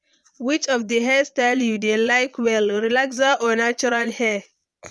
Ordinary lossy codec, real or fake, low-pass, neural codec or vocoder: none; fake; none; vocoder, 22.05 kHz, 80 mel bands, Vocos